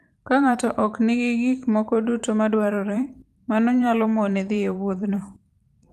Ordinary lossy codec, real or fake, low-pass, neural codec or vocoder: Opus, 32 kbps; fake; 14.4 kHz; vocoder, 44.1 kHz, 128 mel bands every 512 samples, BigVGAN v2